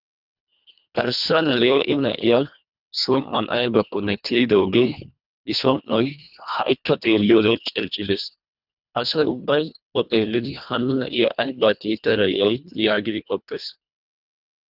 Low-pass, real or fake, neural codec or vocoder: 5.4 kHz; fake; codec, 24 kHz, 1.5 kbps, HILCodec